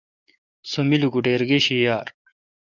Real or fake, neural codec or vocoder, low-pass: fake; codec, 44.1 kHz, 7.8 kbps, DAC; 7.2 kHz